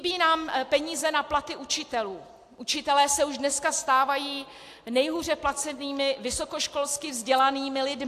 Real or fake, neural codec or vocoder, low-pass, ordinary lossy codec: real; none; 14.4 kHz; AAC, 64 kbps